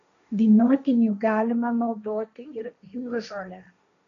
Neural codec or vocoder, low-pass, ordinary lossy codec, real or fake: codec, 16 kHz, 1.1 kbps, Voila-Tokenizer; 7.2 kHz; MP3, 64 kbps; fake